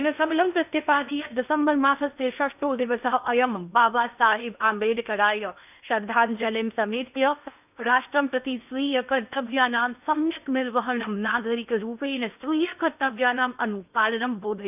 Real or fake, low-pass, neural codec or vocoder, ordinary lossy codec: fake; 3.6 kHz; codec, 16 kHz in and 24 kHz out, 0.8 kbps, FocalCodec, streaming, 65536 codes; none